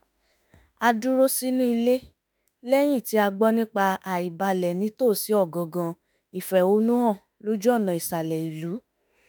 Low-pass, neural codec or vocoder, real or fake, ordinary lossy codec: none; autoencoder, 48 kHz, 32 numbers a frame, DAC-VAE, trained on Japanese speech; fake; none